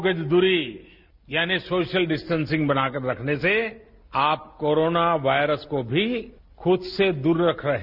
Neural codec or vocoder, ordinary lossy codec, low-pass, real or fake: none; none; 5.4 kHz; real